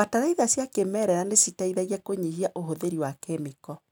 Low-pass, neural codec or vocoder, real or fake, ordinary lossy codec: none; none; real; none